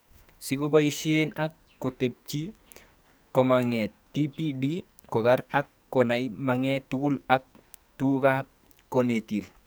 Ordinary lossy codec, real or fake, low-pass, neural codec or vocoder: none; fake; none; codec, 44.1 kHz, 2.6 kbps, SNAC